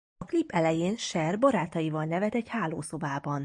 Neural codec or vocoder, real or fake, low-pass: none; real; 10.8 kHz